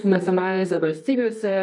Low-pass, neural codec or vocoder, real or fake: 10.8 kHz; codec, 24 kHz, 0.9 kbps, WavTokenizer, medium music audio release; fake